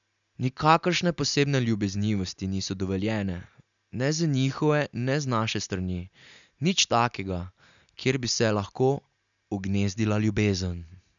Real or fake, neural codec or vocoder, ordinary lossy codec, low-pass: real; none; none; 7.2 kHz